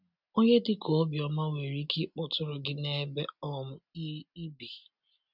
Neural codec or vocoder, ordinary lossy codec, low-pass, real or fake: none; none; 5.4 kHz; real